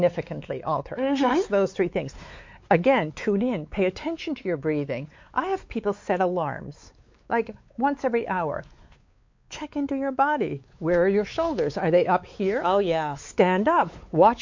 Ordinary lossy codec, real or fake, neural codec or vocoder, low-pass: MP3, 48 kbps; fake; codec, 16 kHz, 4 kbps, X-Codec, WavLM features, trained on Multilingual LibriSpeech; 7.2 kHz